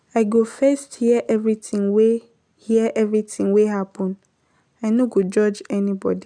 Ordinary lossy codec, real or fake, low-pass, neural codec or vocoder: none; real; 9.9 kHz; none